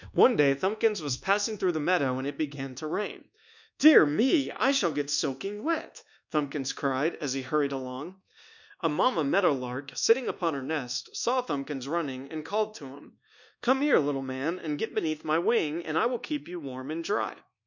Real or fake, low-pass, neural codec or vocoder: fake; 7.2 kHz; codec, 24 kHz, 1.2 kbps, DualCodec